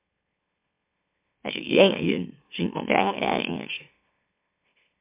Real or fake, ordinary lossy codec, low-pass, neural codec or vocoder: fake; MP3, 32 kbps; 3.6 kHz; autoencoder, 44.1 kHz, a latent of 192 numbers a frame, MeloTTS